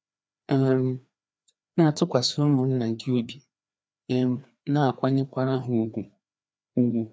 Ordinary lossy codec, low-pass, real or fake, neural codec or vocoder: none; none; fake; codec, 16 kHz, 2 kbps, FreqCodec, larger model